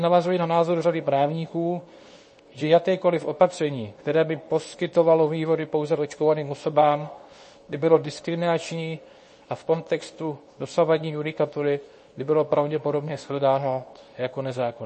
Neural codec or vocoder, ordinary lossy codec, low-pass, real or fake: codec, 24 kHz, 0.9 kbps, WavTokenizer, medium speech release version 2; MP3, 32 kbps; 10.8 kHz; fake